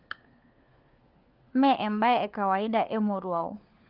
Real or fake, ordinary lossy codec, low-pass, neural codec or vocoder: fake; Opus, 24 kbps; 5.4 kHz; codec, 16 kHz, 4 kbps, FunCodec, trained on LibriTTS, 50 frames a second